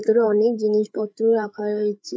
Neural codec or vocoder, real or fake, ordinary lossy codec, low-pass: codec, 16 kHz, 8 kbps, FreqCodec, larger model; fake; none; none